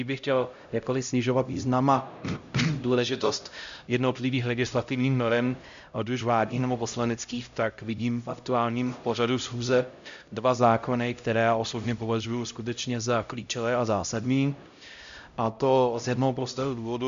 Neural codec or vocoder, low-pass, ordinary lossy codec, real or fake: codec, 16 kHz, 0.5 kbps, X-Codec, HuBERT features, trained on LibriSpeech; 7.2 kHz; MP3, 64 kbps; fake